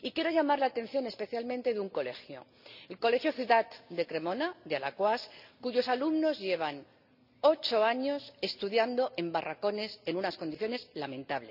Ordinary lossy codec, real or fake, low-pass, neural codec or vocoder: none; real; 5.4 kHz; none